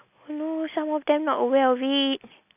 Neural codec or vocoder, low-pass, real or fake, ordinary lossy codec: none; 3.6 kHz; real; none